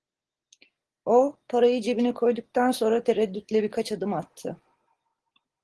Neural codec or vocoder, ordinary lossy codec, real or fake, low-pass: none; Opus, 16 kbps; real; 10.8 kHz